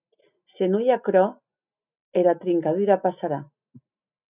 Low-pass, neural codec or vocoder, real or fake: 3.6 kHz; none; real